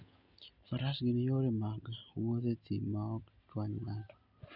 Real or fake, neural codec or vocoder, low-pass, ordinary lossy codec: real; none; 5.4 kHz; none